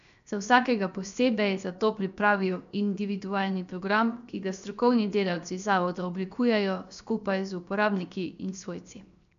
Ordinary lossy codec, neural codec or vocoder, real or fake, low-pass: none; codec, 16 kHz, 0.7 kbps, FocalCodec; fake; 7.2 kHz